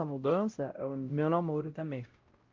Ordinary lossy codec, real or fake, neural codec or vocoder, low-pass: Opus, 32 kbps; fake; codec, 16 kHz, 0.5 kbps, X-Codec, WavLM features, trained on Multilingual LibriSpeech; 7.2 kHz